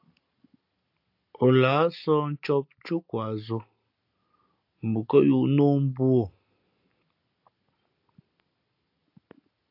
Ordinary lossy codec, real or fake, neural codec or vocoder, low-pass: AAC, 48 kbps; real; none; 5.4 kHz